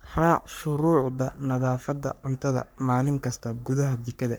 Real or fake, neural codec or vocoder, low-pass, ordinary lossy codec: fake; codec, 44.1 kHz, 3.4 kbps, Pupu-Codec; none; none